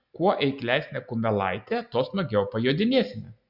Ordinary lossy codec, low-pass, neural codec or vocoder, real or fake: Opus, 64 kbps; 5.4 kHz; none; real